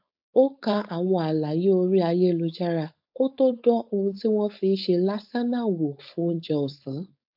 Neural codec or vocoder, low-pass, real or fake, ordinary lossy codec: codec, 16 kHz, 4.8 kbps, FACodec; 5.4 kHz; fake; AAC, 48 kbps